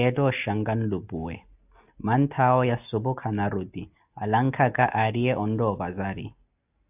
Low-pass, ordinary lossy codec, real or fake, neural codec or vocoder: 3.6 kHz; AAC, 32 kbps; real; none